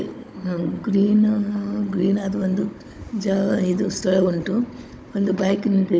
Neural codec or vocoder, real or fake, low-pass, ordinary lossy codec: codec, 16 kHz, 16 kbps, FunCodec, trained on Chinese and English, 50 frames a second; fake; none; none